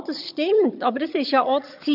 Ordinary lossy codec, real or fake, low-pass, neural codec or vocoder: none; fake; 5.4 kHz; vocoder, 22.05 kHz, 80 mel bands, HiFi-GAN